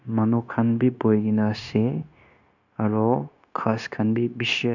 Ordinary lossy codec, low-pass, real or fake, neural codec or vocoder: none; 7.2 kHz; fake; codec, 16 kHz, 0.9 kbps, LongCat-Audio-Codec